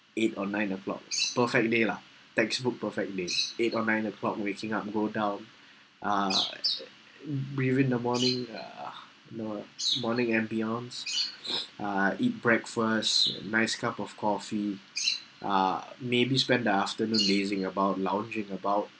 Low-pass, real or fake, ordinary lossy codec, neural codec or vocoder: none; real; none; none